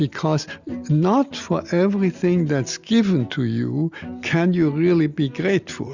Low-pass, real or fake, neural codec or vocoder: 7.2 kHz; real; none